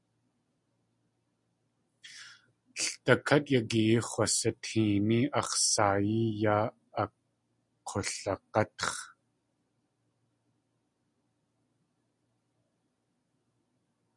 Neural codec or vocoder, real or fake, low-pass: none; real; 10.8 kHz